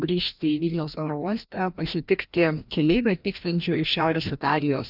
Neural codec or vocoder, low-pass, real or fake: codec, 16 kHz, 1 kbps, FreqCodec, larger model; 5.4 kHz; fake